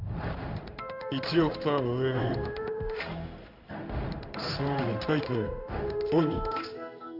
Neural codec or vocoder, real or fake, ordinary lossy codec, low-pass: codec, 16 kHz in and 24 kHz out, 1 kbps, XY-Tokenizer; fake; none; 5.4 kHz